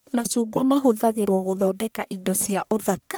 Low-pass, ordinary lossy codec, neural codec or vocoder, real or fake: none; none; codec, 44.1 kHz, 1.7 kbps, Pupu-Codec; fake